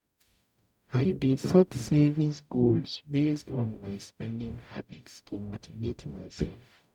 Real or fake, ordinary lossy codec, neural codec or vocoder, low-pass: fake; none; codec, 44.1 kHz, 0.9 kbps, DAC; 19.8 kHz